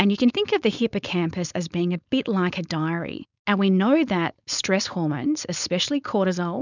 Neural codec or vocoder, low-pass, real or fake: codec, 16 kHz, 4.8 kbps, FACodec; 7.2 kHz; fake